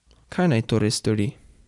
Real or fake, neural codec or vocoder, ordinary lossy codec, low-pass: real; none; none; 10.8 kHz